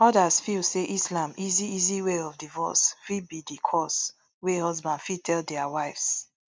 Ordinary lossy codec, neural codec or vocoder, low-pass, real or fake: none; none; none; real